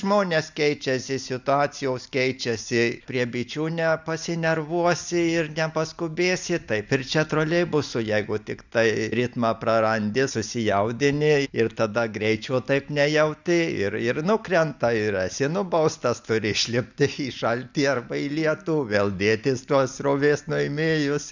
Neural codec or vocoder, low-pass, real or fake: none; 7.2 kHz; real